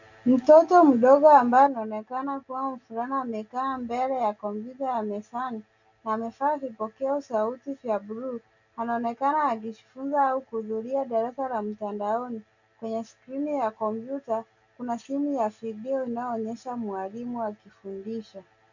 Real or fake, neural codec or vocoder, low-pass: real; none; 7.2 kHz